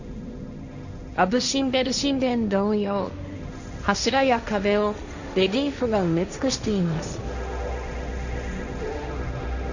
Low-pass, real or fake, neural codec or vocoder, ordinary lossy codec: 7.2 kHz; fake; codec, 16 kHz, 1.1 kbps, Voila-Tokenizer; none